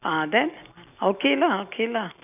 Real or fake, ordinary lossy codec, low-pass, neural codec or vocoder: real; none; 3.6 kHz; none